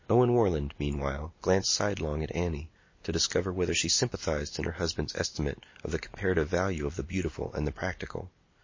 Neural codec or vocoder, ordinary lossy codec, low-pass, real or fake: none; MP3, 32 kbps; 7.2 kHz; real